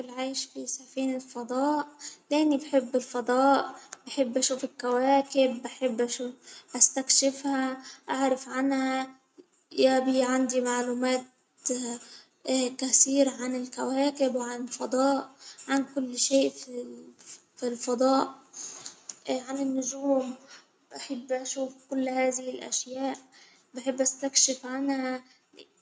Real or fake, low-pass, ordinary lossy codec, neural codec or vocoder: real; none; none; none